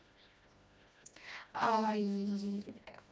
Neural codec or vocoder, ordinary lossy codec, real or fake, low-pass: codec, 16 kHz, 1 kbps, FreqCodec, smaller model; none; fake; none